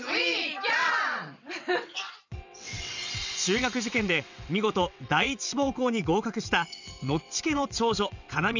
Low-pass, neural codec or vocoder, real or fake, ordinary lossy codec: 7.2 kHz; vocoder, 22.05 kHz, 80 mel bands, WaveNeXt; fake; none